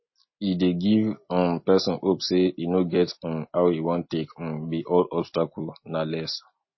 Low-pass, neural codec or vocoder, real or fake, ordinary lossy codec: 7.2 kHz; none; real; MP3, 24 kbps